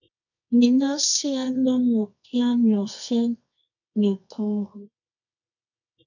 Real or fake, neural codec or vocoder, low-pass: fake; codec, 24 kHz, 0.9 kbps, WavTokenizer, medium music audio release; 7.2 kHz